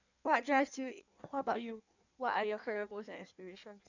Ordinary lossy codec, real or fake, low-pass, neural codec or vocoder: none; fake; 7.2 kHz; codec, 16 kHz in and 24 kHz out, 1.1 kbps, FireRedTTS-2 codec